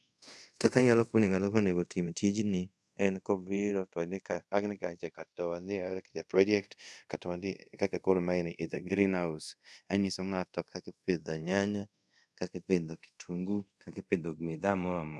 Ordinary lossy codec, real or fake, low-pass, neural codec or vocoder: none; fake; none; codec, 24 kHz, 0.5 kbps, DualCodec